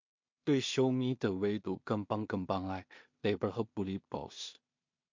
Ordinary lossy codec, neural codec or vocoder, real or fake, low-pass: MP3, 48 kbps; codec, 16 kHz in and 24 kHz out, 0.4 kbps, LongCat-Audio-Codec, two codebook decoder; fake; 7.2 kHz